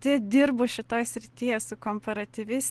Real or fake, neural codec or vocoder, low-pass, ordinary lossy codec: real; none; 10.8 kHz; Opus, 16 kbps